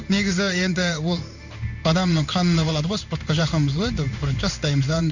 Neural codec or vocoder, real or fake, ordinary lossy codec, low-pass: codec, 16 kHz in and 24 kHz out, 1 kbps, XY-Tokenizer; fake; none; 7.2 kHz